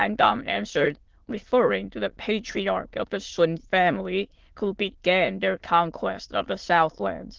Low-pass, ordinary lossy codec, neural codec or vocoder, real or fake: 7.2 kHz; Opus, 16 kbps; autoencoder, 22.05 kHz, a latent of 192 numbers a frame, VITS, trained on many speakers; fake